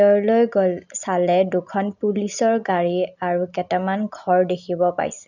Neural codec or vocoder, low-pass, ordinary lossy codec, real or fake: none; 7.2 kHz; none; real